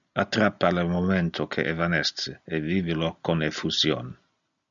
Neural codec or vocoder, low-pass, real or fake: none; 7.2 kHz; real